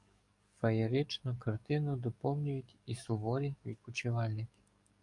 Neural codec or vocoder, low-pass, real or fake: codec, 44.1 kHz, 7.8 kbps, DAC; 10.8 kHz; fake